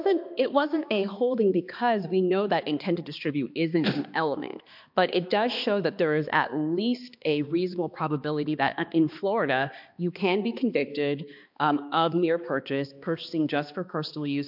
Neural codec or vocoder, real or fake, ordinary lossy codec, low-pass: codec, 16 kHz, 2 kbps, X-Codec, HuBERT features, trained on balanced general audio; fake; MP3, 48 kbps; 5.4 kHz